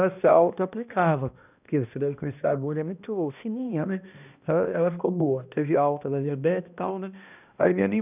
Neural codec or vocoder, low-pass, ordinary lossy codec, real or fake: codec, 16 kHz, 1 kbps, X-Codec, HuBERT features, trained on balanced general audio; 3.6 kHz; none; fake